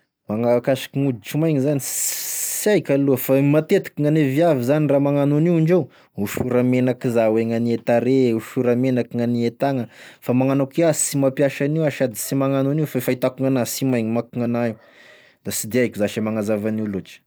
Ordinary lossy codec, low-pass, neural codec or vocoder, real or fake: none; none; none; real